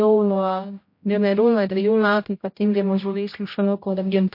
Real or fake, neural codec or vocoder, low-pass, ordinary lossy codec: fake; codec, 16 kHz, 0.5 kbps, X-Codec, HuBERT features, trained on general audio; 5.4 kHz; MP3, 32 kbps